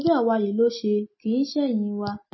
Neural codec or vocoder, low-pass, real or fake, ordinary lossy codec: none; 7.2 kHz; real; MP3, 24 kbps